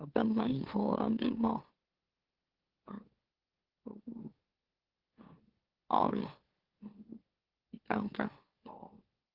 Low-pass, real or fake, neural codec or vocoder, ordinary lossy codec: 5.4 kHz; fake; autoencoder, 44.1 kHz, a latent of 192 numbers a frame, MeloTTS; Opus, 16 kbps